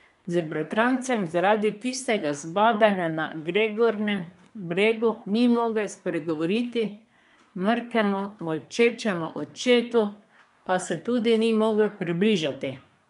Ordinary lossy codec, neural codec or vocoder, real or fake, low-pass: none; codec, 24 kHz, 1 kbps, SNAC; fake; 10.8 kHz